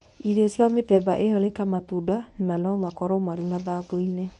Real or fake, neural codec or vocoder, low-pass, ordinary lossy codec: fake; codec, 24 kHz, 0.9 kbps, WavTokenizer, medium speech release version 1; 10.8 kHz; MP3, 96 kbps